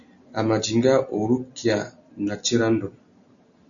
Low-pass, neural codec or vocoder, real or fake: 7.2 kHz; none; real